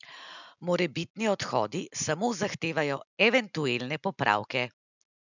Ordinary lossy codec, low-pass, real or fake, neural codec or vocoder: none; 7.2 kHz; real; none